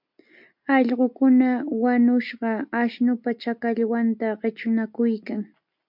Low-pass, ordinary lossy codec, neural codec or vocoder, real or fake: 5.4 kHz; MP3, 48 kbps; none; real